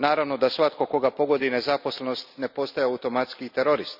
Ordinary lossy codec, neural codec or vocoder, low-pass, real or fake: none; none; 5.4 kHz; real